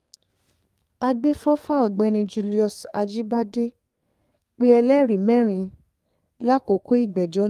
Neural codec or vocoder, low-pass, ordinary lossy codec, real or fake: codec, 32 kHz, 1.9 kbps, SNAC; 14.4 kHz; Opus, 32 kbps; fake